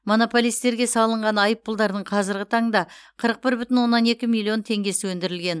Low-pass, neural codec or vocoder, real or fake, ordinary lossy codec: none; none; real; none